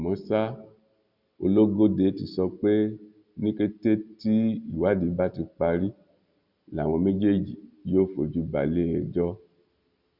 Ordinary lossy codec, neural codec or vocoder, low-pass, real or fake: Opus, 64 kbps; none; 5.4 kHz; real